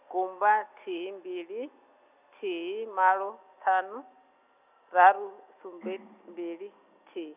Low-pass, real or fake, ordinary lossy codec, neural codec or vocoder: 3.6 kHz; real; none; none